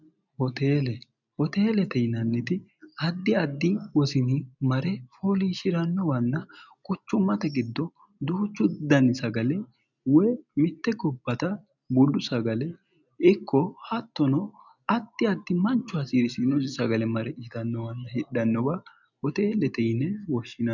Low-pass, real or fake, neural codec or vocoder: 7.2 kHz; real; none